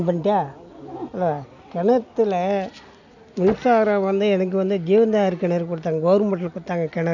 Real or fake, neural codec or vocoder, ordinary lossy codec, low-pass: real; none; none; 7.2 kHz